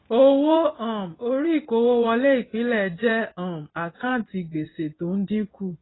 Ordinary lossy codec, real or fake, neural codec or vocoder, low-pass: AAC, 16 kbps; fake; vocoder, 24 kHz, 100 mel bands, Vocos; 7.2 kHz